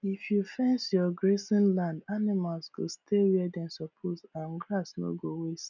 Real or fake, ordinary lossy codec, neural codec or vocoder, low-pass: real; none; none; 7.2 kHz